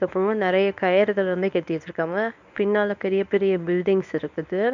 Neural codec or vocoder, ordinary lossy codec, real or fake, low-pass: codec, 24 kHz, 0.9 kbps, WavTokenizer, medium speech release version 1; none; fake; 7.2 kHz